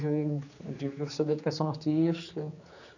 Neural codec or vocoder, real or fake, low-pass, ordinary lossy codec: codec, 16 kHz, 4 kbps, X-Codec, HuBERT features, trained on general audio; fake; 7.2 kHz; none